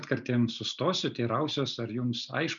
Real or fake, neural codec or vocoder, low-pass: real; none; 7.2 kHz